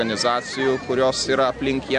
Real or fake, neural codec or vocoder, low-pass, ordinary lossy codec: real; none; 9.9 kHz; AAC, 64 kbps